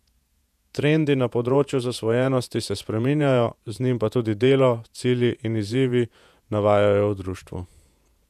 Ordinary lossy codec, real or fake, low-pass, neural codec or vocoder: none; fake; 14.4 kHz; vocoder, 48 kHz, 128 mel bands, Vocos